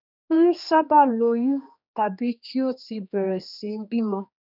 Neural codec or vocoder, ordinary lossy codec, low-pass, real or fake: codec, 16 kHz, 2 kbps, X-Codec, HuBERT features, trained on general audio; none; 5.4 kHz; fake